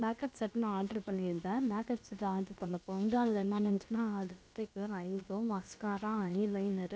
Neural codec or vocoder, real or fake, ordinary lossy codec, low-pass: codec, 16 kHz, about 1 kbps, DyCAST, with the encoder's durations; fake; none; none